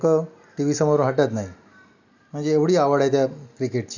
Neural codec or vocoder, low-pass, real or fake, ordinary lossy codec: none; 7.2 kHz; real; none